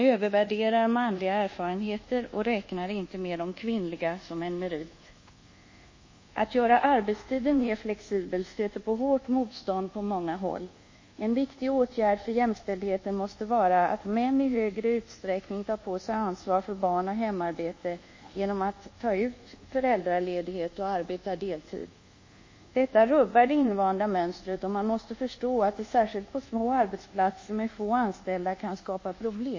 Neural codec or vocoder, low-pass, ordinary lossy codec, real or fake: codec, 24 kHz, 1.2 kbps, DualCodec; 7.2 kHz; MP3, 32 kbps; fake